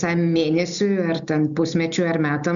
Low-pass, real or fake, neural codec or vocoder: 7.2 kHz; real; none